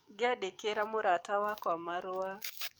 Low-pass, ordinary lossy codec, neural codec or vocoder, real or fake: none; none; codec, 44.1 kHz, 7.8 kbps, DAC; fake